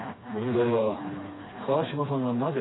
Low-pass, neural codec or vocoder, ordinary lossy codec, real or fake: 7.2 kHz; codec, 16 kHz, 2 kbps, FreqCodec, smaller model; AAC, 16 kbps; fake